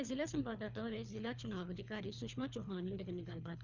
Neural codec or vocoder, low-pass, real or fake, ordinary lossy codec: codec, 24 kHz, 3 kbps, HILCodec; 7.2 kHz; fake; none